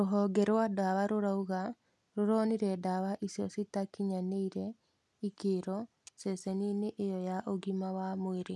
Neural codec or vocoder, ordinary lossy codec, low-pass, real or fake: none; none; none; real